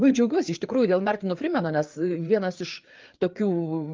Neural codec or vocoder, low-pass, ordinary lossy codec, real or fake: codec, 16 kHz, 4 kbps, FreqCodec, larger model; 7.2 kHz; Opus, 24 kbps; fake